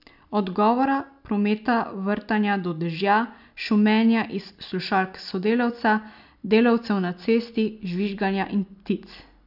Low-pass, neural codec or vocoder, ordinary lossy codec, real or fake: 5.4 kHz; none; none; real